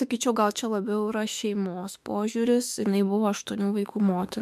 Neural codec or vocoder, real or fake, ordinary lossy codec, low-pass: autoencoder, 48 kHz, 32 numbers a frame, DAC-VAE, trained on Japanese speech; fake; MP3, 96 kbps; 14.4 kHz